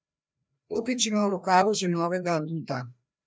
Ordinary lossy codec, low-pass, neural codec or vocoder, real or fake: none; none; codec, 16 kHz, 1 kbps, FreqCodec, larger model; fake